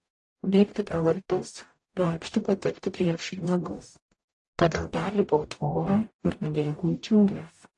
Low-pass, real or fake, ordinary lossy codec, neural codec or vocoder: 10.8 kHz; fake; AAC, 48 kbps; codec, 44.1 kHz, 0.9 kbps, DAC